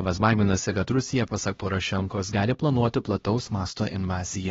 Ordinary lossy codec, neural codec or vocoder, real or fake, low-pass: AAC, 24 kbps; codec, 16 kHz, 1 kbps, X-Codec, HuBERT features, trained on LibriSpeech; fake; 7.2 kHz